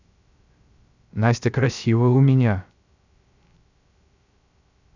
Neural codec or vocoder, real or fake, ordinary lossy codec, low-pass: codec, 16 kHz, 0.3 kbps, FocalCodec; fake; none; 7.2 kHz